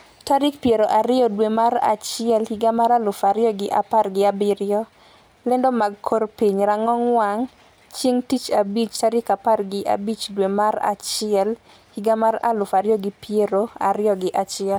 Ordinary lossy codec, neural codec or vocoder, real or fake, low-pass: none; none; real; none